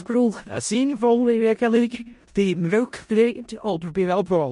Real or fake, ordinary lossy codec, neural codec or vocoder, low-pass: fake; MP3, 48 kbps; codec, 16 kHz in and 24 kHz out, 0.4 kbps, LongCat-Audio-Codec, four codebook decoder; 10.8 kHz